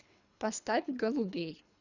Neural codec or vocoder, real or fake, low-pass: codec, 24 kHz, 3 kbps, HILCodec; fake; 7.2 kHz